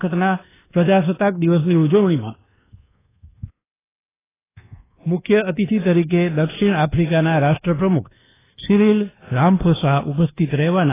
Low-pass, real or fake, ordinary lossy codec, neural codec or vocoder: 3.6 kHz; fake; AAC, 16 kbps; codec, 24 kHz, 1.2 kbps, DualCodec